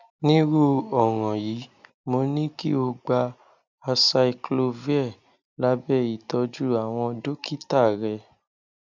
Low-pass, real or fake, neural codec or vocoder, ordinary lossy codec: 7.2 kHz; real; none; none